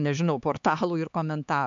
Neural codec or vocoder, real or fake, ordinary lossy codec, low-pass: codec, 16 kHz, 4 kbps, X-Codec, HuBERT features, trained on LibriSpeech; fake; MP3, 64 kbps; 7.2 kHz